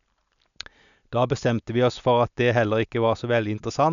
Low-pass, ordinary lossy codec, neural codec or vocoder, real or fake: 7.2 kHz; none; none; real